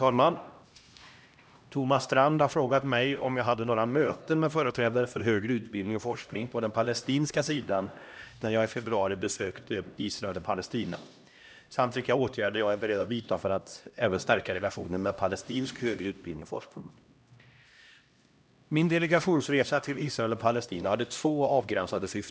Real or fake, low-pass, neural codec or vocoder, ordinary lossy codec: fake; none; codec, 16 kHz, 1 kbps, X-Codec, HuBERT features, trained on LibriSpeech; none